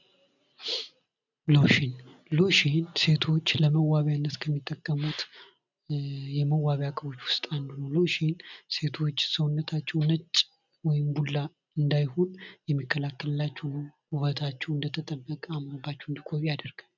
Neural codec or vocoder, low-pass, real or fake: none; 7.2 kHz; real